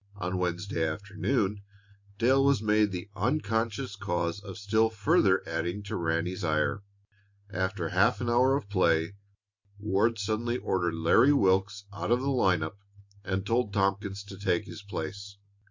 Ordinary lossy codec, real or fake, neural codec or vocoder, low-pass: MP3, 48 kbps; real; none; 7.2 kHz